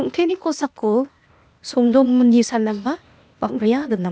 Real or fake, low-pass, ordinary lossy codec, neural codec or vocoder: fake; none; none; codec, 16 kHz, 0.8 kbps, ZipCodec